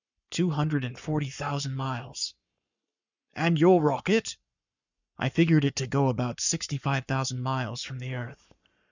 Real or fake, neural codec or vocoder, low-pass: fake; codec, 44.1 kHz, 7.8 kbps, Pupu-Codec; 7.2 kHz